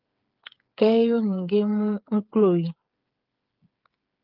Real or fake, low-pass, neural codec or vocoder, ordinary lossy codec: fake; 5.4 kHz; codec, 16 kHz, 8 kbps, FreqCodec, smaller model; Opus, 32 kbps